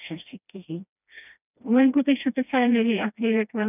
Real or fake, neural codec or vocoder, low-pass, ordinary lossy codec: fake; codec, 16 kHz, 1 kbps, FreqCodec, smaller model; 3.6 kHz; none